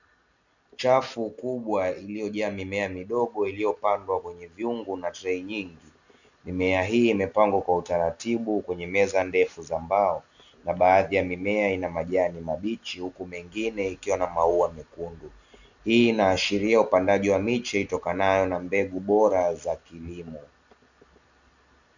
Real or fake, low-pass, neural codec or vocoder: real; 7.2 kHz; none